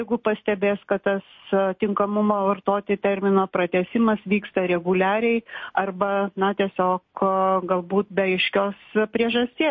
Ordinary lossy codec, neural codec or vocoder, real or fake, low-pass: MP3, 32 kbps; none; real; 7.2 kHz